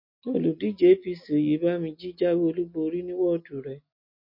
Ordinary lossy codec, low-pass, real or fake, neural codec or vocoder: MP3, 32 kbps; 5.4 kHz; real; none